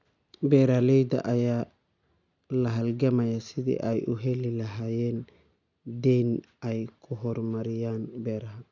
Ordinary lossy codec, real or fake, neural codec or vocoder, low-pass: none; real; none; 7.2 kHz